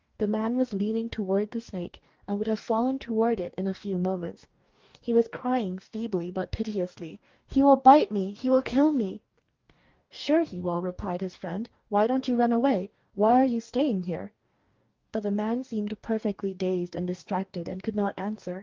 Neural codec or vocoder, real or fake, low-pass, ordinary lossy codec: codec, 44.1 kHz, 2.6 kbps, DAC; fake; 7.2 kHz; Opus, 32 kbps